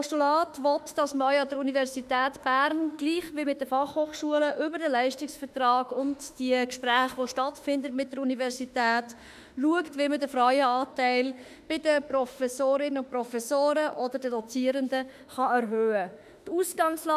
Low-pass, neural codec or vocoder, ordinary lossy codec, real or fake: 14.4 kHz; autoencoder, 48 kHz, 32 numbers a frame, DAC-VAE, trained on Japanese speech; none; fake